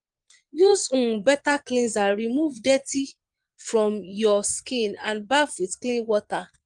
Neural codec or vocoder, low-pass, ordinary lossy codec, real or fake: vocoder, 22.05 kHz, 80 mel bands, Vocos; 9.9 kHz; Opus, 24 kbps; fake